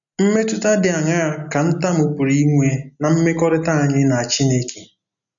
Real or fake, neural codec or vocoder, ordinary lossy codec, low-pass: real; none; none; 7.2 kHz